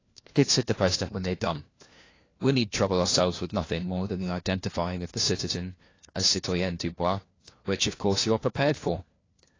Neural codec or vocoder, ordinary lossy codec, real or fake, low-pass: codec, 16 kHz, 1 kbps, FunCodec, trained on LibriTTS, 50 frames a second; AAC, 32 kbps; fake; 7.2 kHz